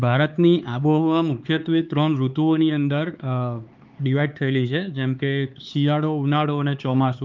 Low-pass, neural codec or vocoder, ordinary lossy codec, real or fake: 7.2 kHz; codec, 16 kHz, 4 kbps, X-Codec, HuBERT features, trained on LibriSpeech; Opus, 32 kbps; fake